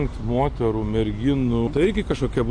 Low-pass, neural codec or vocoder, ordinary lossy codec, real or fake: 9.9 kHz; none; MP3, 48 kbps; real